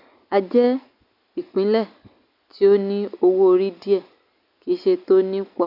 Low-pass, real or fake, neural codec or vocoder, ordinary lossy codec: 5.4 kHz; real; none; none